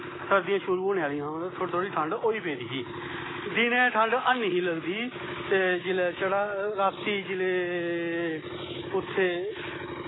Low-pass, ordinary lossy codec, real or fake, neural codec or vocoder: 7.2 kHz; AAC, 16 kbps; real; none